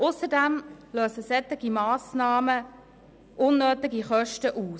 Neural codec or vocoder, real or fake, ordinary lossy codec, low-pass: none; real; none; none